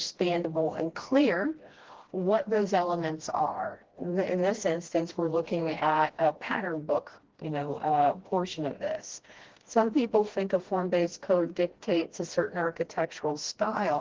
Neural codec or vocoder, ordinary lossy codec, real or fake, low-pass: codec, 16 kHz, 1 kbps, FreqCodec, smaller model; Opus, 16 kbps; fake; 7.2 kHz